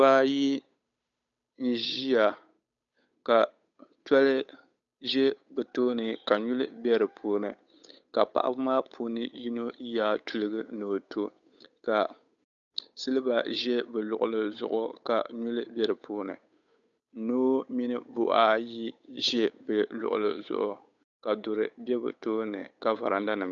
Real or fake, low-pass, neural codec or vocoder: fake; 7.2 kHz; codec, 16 kHz, 8 kbps, FunCodec, trained on Chinese and English, 25 frames a second